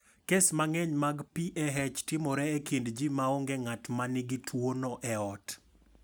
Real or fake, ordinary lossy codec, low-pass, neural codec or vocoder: real; none; none; none